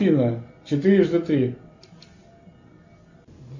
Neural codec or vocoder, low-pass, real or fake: none; 7.2 kHz; real